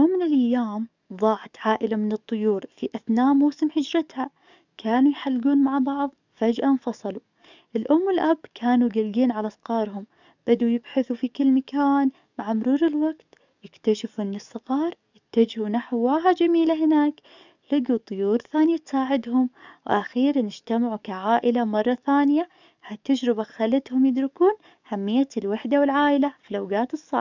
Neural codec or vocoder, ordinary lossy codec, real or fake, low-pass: codec, 44.1 kHz, 7.8 kbps, DAC; none; fake; 7.2 kHz